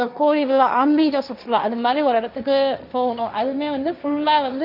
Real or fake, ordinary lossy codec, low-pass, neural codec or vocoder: fake; Opus, 64 kbps; 5.4 kHz; codec, 16 kHz, 1.1 kbps, Voila-Tokenizer